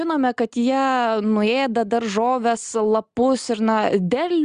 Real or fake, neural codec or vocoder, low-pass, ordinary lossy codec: real; none; 9.9 kHz; Opus, 64 kbps